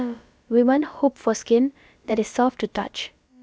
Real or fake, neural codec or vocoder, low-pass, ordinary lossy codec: fake; codec, 16 kHz, about 1 kbps, DyCAST, with the encoder's durations; none; none